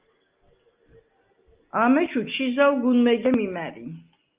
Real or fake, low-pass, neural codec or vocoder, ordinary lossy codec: real; 3.6 kHz; none; Opus, 32 kbps